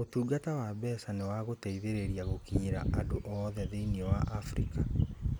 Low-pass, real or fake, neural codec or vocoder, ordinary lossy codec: none; real; none; none